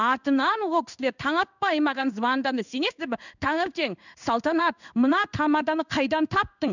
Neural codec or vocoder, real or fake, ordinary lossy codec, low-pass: codec, 16 kHz in and 24 kHz out, 1 kbps, XY-Tokenizer; fake; none; 7.2 kHz